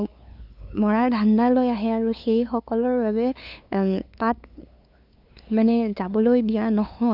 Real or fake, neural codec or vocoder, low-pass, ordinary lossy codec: fake; codec, 16 kHz, 2 kbps, FunCodec, trained on LibriTTS, 25 frames a second; 5.4 kHz; none